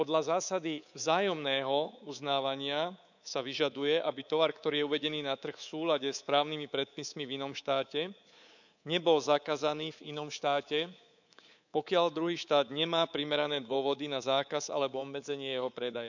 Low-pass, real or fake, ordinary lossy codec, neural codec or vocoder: 7.2 kHz; fake; none; codec, 24 kHz, 3.1 kbps, DualCodec